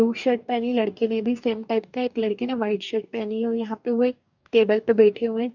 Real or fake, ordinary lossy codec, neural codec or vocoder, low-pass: fake; none; codec, 44.1 kHz, 2.6 kbps, DAC; 7.2 kHz